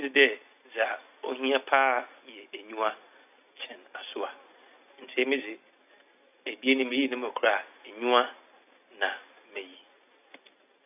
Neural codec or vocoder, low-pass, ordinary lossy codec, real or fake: none; 3.6 kHz; none; real